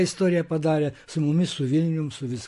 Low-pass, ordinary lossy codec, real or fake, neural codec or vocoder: 14.4 kHz; MP3, 48 kbps; real; none